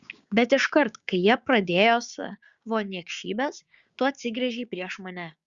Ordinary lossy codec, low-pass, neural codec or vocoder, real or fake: Opus, 64 kbps; 7.2 kHz; codec, 16 kHz, 6 kbps, DAC; fake